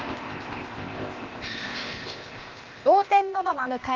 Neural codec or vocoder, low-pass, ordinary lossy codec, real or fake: codec, 16 kHz, 0.8 kbps, ZipCodec; 7.2 kHz; Opus, 24 kbps; fake